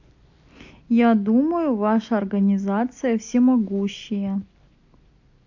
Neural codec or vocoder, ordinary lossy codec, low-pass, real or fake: none; AAC, 48 kbps; 7.2 kHz; real